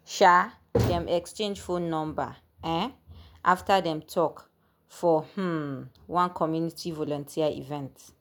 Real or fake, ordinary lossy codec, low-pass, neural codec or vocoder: real; none; none; none